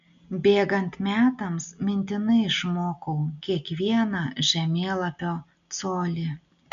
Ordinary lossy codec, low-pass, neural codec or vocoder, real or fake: MP3, 64 kbps; 7.2 kHz; none; real